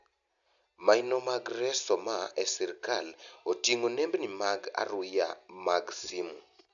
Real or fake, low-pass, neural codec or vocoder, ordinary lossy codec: real; 7.2 kHz; none; none